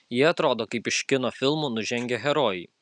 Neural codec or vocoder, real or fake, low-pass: none; real; 10.8 kHz